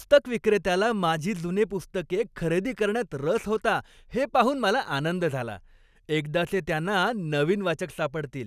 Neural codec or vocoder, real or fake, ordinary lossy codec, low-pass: none; real; none; 14.4 kHz